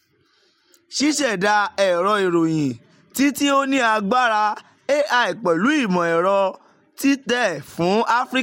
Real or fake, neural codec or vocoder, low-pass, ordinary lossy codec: real; none; 19.8 kHz; MP3, 64 kbps